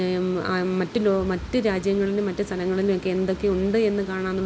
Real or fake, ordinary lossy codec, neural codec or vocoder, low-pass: real; none; none; none